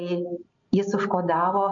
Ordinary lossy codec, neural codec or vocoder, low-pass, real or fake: MP3, 64 kbps; none; 7.2 kHz; real